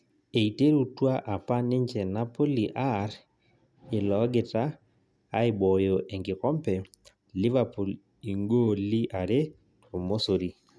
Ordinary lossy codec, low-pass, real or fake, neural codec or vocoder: none; none; real; none